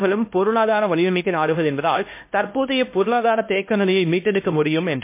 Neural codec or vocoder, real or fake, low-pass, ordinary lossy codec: codec, 16 kHz, 1 kbps, X-Codec, HuBERT features, trained on LibriSpeech; fake; 3.6 kHz; MP3, 24 kbps